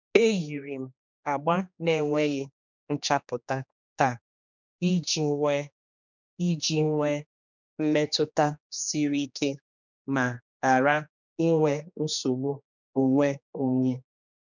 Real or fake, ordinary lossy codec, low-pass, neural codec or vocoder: fake; none; 7.2 kHz; codec, 16 kHz, 2 kbps, X-Codec, HuBERT features, trained on general audio